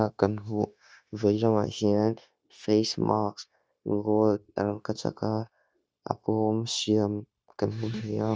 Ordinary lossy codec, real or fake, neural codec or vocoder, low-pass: none; fake; codec, 16 kHz, 0.9 kbps, LongCat-Audio-Codec; none